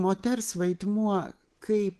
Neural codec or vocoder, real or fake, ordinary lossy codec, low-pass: codec, 24 kHz, 3.1 kbps, DualCodec; fake; Opus, 16 kbps; 10.8 kHz